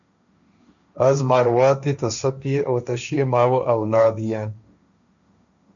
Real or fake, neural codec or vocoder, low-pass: fake; codec, 16 kHz, 1.1 kbps, Voila-Tokenizer; 7.2 kHz